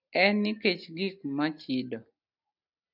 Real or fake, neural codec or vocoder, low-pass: real; none; 5.4 kHz